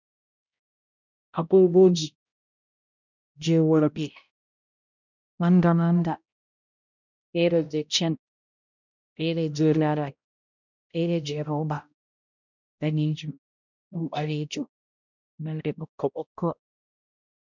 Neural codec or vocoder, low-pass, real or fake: codec, 16 kHz, 0.5 kbps, X-Codec, HuBERT features, trained on balanced general audio; 7.2 kHz; fake